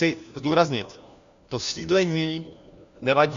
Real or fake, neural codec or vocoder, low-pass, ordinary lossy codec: fake; codec, 16 kHz, 1 kbps, FunCodec, trained on LibriTTS, 50 frames a second; 7.2 kHz; Opus, 64 kbps